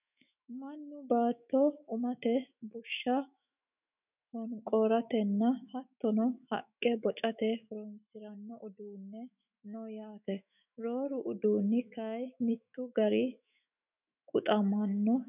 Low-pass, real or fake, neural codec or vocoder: 3.6 kHz; fake; autoencoder, 48 kHz, 128 numbers a frame, DAC-VAE, trained on Japanese speech